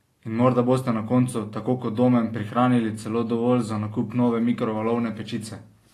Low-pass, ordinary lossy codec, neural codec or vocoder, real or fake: 14.4 kHz; AAC, 48 kbps; none; real